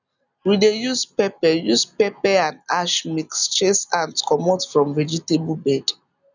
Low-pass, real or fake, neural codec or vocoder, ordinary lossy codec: 7.2 kHz; real; none; none